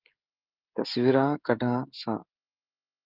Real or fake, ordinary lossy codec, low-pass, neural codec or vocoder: fake; Opus, 32 kbps; 5.4 kHz; codec, 16 kHz, 16 kbps, FreqCodec, smaller model